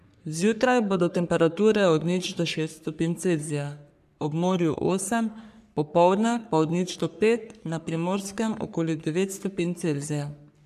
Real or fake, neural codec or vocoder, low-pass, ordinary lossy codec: fake; codec, 44.1 kHz, 3.4 kbps, Pupu-Codec; 14.4 kHz; none